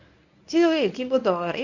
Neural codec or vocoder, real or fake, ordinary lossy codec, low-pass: codec, 24 kHz, 0.9 kbps, WavTokenizer, medium speech release version 1; fake; none; 7.2 kHz